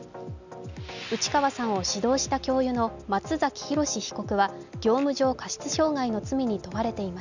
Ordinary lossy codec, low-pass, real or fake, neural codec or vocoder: none; 7.2 kHz; real; none